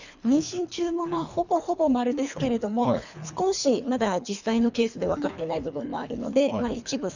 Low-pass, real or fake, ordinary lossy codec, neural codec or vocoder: 7.2 kHz; fake; none; codec, 24 kHz, 3 kbps, HILCodec